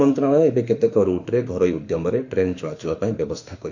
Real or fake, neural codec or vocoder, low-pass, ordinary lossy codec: fake; autoencoder, 48 kHz, 32 numbers a frame, DAC-VAE, trained on Japanese speech; 7.2 kHz; none